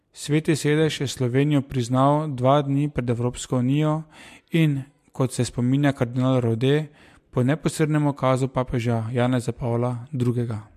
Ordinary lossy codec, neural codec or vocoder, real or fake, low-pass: MP3, 64 kbps; none; real; 14.4 kHz